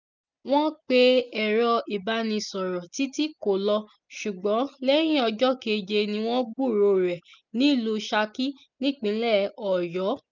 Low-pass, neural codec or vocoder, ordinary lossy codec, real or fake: 7.2 kHz; none; none; real